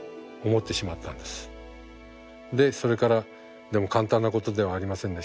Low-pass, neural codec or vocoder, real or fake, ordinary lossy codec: none; none; real; none